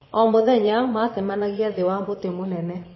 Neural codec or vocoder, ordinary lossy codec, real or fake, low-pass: vocoder, 22.05 kHz, 80 mel bands, Vocos; MP3, 24 kbps; fake; 7.2 kHz